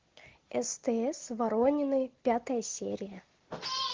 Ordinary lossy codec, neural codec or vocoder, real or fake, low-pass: Opus, 16 kbps; none; real; 7.2 kHz